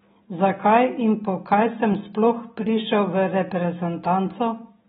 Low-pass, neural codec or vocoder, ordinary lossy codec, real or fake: 19.8 kHz; none; AAC, 16 kbps; real